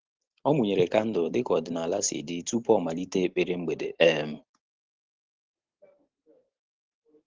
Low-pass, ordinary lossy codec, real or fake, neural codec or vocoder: 7.2 kHz; Opus, 16 kbps; real; none